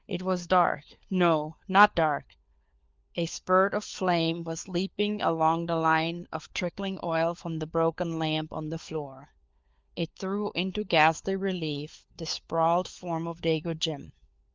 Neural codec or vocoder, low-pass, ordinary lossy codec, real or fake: codec, 16 kHz, 4 kbps, FunCodec, trained on LibriTTS, 50 frames a second; 7.2 kHz; Opus, 32 kbps; fake